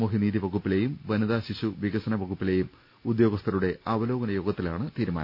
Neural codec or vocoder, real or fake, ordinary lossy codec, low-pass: none; real; none; 5.4 kHz